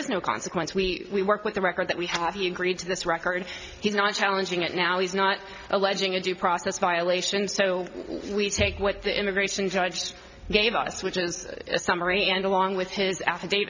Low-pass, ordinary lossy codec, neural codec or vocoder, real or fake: 7.2 kHz; MP3, 64 kbps; none; real